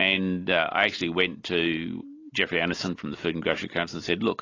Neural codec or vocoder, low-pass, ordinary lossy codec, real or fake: none; 7.2 kHz; AAC, 32 kbps; real